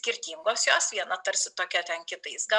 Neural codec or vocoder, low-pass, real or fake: none; 10.8 kHz; real